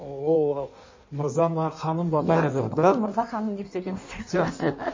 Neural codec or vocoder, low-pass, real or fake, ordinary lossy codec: codec, 16 kHz in and 24 kHz out, 1.1 kbps, FireRedTTS-2 codec; 7.2 kHz; fake; MP3, 32 kbps